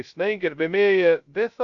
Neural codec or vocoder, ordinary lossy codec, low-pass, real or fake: codec, 16 kHz, 0.2 kbps, FocalCodec; AAC, 64 kbps; 7.2 kHz; fake